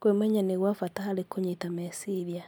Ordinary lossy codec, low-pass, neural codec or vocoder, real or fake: none; none; none; real